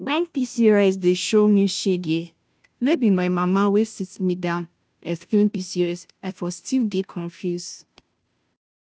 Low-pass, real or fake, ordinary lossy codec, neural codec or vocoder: none; fake; none; codec, 16 kHz, 0.5 kbps, FunCodec, trained on Chinese and English, 25 frames a second